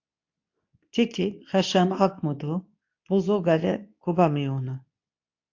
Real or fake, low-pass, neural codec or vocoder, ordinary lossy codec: fake; 7.2 kHz; codec, 24 kHz, 0.9 kbps, WavTokenizer, medium speech release version 2; AAC, 48 kbps